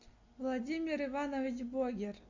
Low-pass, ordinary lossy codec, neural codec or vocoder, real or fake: 7.2 kHz; MP3, 64 kbps; none; real